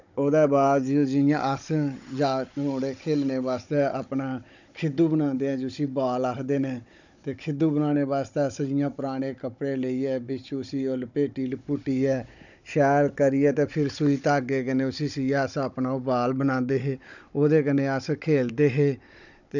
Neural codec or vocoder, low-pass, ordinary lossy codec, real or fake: codec, 16 kHz, 16 kbps, FunCodec, trained on Chinese and English, 50 frames a second; 7.2 kHz; none; fake